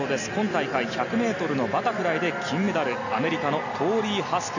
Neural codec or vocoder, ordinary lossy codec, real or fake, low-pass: none; none; real; 7.2 kHz